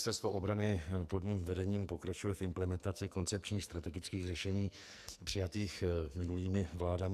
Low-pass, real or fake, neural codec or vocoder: 14.4 kHz; fake; codec, 44.1 kHz, 2.6 kbps, SNAC